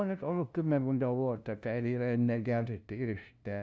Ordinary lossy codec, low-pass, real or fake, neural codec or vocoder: none; none; fake; codec, 16 kHz, 0.5 kbps, FunCodec, trained on LibriTTS, 25 frames a second